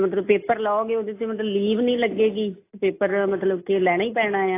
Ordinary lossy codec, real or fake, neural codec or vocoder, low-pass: AAC, 24 kbps; real; none; 3.6 kHz